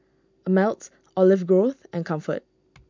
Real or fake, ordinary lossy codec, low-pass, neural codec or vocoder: real; MP3, 64 kbps; 7.2 kHz; none